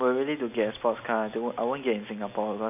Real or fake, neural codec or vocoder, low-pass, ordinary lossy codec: real; none; 3.6 kHz; none